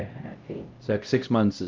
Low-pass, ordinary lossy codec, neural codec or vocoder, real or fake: 7.2 kHz; Opus, 24 kbps; codec, 16 kHz, 0.5 kbps, X-Codec, HuBERT features, trained on LibriSpeech; fake